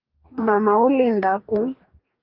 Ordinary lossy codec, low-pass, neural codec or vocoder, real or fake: Opus, 32 kbps; 5.4 kHz; codec, 44.1 kHz, 2.6 kbps, DAC; fake